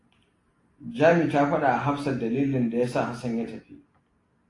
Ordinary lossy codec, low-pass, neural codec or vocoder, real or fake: AAC, 32 kbps; 10.8 kHz; none; real